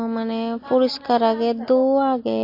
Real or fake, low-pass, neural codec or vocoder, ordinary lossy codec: real; 5.4 kHz; none; MP3, 32 kbps